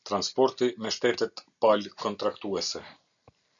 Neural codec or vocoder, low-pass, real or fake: none; 7.2 kHz; real